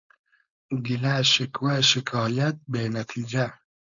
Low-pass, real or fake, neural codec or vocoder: 7.2 kHz; fake; codec, 16 kHz, 4.8 kbps, FACodec